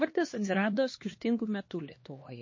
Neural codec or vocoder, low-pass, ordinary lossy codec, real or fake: codec, 16 kHz, 2 kbps, X-Codec, HuBERT features, trained on LibriSpeech; 7.2 kHz; MP3, 32 kbps; fake